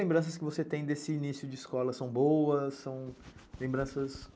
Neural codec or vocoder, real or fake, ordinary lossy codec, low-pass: none; real; none; none